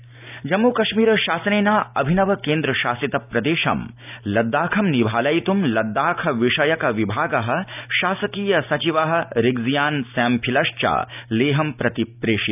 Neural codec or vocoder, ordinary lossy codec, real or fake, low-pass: none; none; real; 3.6 kHz